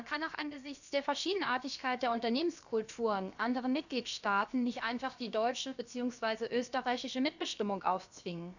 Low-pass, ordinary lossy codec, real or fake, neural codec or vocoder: 7.2 kHz; none; fake; codec, 16 kHz, about 1 kbps, DyCAST, with the encoder's durations